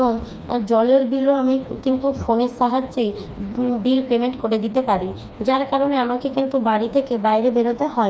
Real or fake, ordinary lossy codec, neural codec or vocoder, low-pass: fake; none; codec, 16 kHz, 2 kbps, FreqCodec, smaller model; none